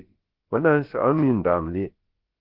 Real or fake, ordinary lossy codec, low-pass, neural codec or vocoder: fake; Opus, 16 kbps; 5.4 kHz; codec, 16 kHz, about 1 kbps, DyCAST, with the encoder's durations